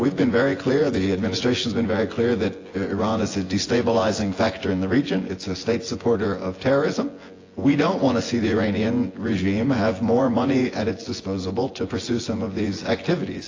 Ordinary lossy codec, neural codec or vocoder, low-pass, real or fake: AAC, 32 kbps; vocoder, 24 kHz, 100 mel bands, Vocos; 7.2 kHz; fake